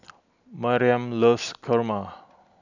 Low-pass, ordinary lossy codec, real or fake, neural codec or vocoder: 7.2 kHz; none; real; none